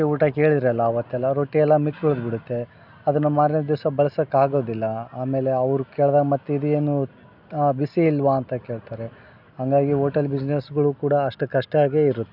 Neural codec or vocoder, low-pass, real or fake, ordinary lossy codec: none; 5.4 kHz; real; none